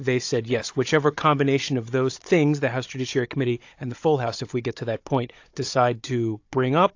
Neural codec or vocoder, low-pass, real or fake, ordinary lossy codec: codec, 16 kHz, 16 kbps, FunCodec, trained on Chinese and English, 50 frames a second; 7.2 kHz; fake; AAC, 48 kbps